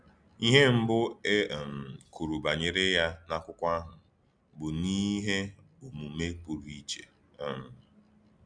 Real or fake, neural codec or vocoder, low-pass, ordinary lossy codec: real; none; 9.9 kHz; none